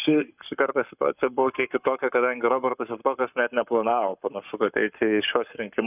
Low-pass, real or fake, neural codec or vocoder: 3.6 kHz; fake; codec, 44.1 kHz, 7.8 kbps, Pupu-Codec